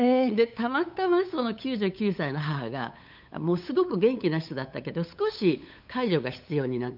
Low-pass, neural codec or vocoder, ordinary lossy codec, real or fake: 5.4 kHz; codec, 16 kHz, 8 kbps, FunCodec, trained on LibriTTS, 25 frames a second; none; fake